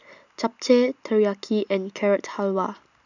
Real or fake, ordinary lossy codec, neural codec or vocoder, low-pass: real; none; none; 7.2 kHz